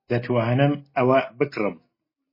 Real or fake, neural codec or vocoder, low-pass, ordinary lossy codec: real; none; 5.4 kHz; MP3, 24 kbps